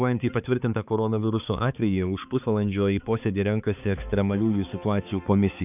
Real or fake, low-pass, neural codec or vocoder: fake; 3.6 kHz; codec, 16 kHz, 4 kbps, X-Codec, HuBERT features, trained on balanced general audio